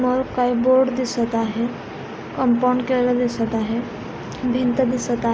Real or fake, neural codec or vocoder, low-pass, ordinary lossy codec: real; none; none; none